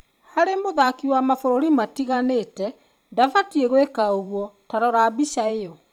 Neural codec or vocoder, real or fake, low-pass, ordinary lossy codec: vocoder, 44.1 kHz, 128 mel bands every 512 samples, BigVGAN v2; fake; 19.8 kHz; none